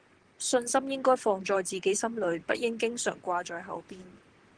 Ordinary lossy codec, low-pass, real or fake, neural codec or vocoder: Opus, 16 kbps; 9.9 kHz; fake; vocoder, 44.1 kHz, 128 mel bands, Pupu-Vocoder